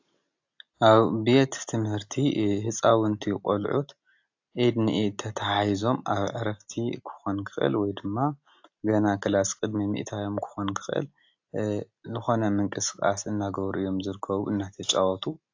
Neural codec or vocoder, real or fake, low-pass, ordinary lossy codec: none; real; 7.2 kHz; AAC, 48 kbps